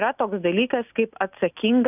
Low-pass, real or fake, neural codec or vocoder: 3.6 kHz; real; none